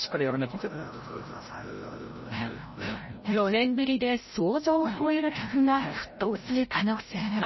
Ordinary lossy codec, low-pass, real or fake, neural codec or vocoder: MP3, 24 kbps; 7.2 kHz; fake; codec, 16 kHz, 0.5 kbps, FreqCodec, larger model